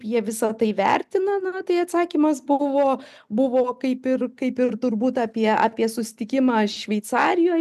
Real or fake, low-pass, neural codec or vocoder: real; 14.4 kHz; none